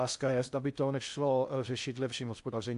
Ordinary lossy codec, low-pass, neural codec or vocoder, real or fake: AAC, 64 kbps; 10.8 kHz; codec, 16 kHz in and 24 kHz out, 0.6 kbps, FocalCodec, streaming, 4096 codes; fake